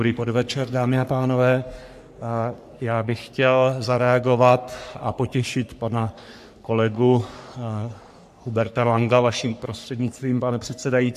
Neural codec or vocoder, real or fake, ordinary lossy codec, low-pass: codec, 44.1 kHz, 3.4 kbps, Pupu-Codec; fake; AAC, 96 kbps; 14.4 kHz